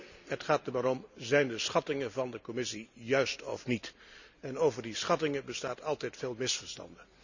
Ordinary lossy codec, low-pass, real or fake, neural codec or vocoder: none; 7.2 kHz; real; none